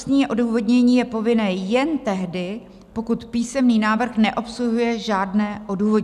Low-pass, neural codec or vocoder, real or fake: 14.4 kHz; none; real